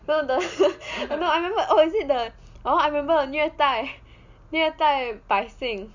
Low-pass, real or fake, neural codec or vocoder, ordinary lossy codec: 7.2 kHz; real; none; Opus, 64 kbps